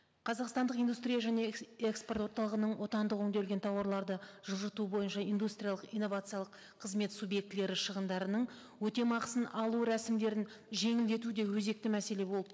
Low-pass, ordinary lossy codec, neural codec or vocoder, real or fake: none; none; none; real